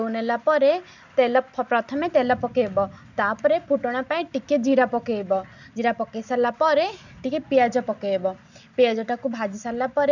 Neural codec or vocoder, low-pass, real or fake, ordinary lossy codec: none; 7.2 kHz; real; none